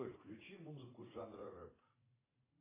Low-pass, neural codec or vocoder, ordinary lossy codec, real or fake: 3.6 kHz; vocoder, 44.1 kHz, 80 mel bands, Vocos; AAC, 16 kbps; fake